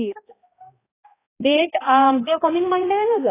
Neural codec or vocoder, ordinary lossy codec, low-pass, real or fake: codec, 16 kHz, 0.5 kbps, X-Codec, HuBERT features, trained on balanced general audio; AAC, 16 kbps; 3.6 kHz; fake